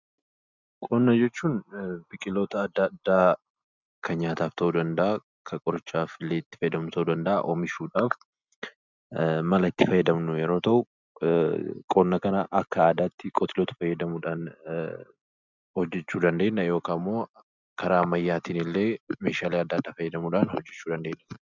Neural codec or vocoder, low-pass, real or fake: none; 7.2 kHz; real